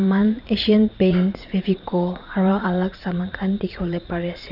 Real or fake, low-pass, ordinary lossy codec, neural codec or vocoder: fake; 5.4 kHz; none; vocoder, 44.1 kHz, 80 mel bands, Vocos